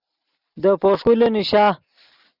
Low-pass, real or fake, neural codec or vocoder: 5.4 kHz; real; none